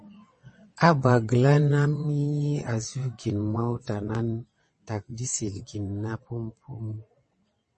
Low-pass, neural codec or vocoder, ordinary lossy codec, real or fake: 9.9 kHz; vocoder, 22.05 kHz, 80 mel bands, WaveNeXt; MP3, 32 kbps; fake